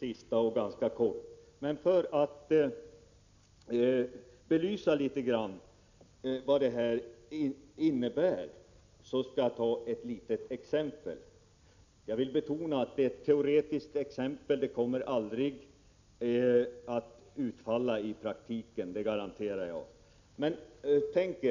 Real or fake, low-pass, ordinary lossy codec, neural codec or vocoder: real; 7.2 kHz; none; none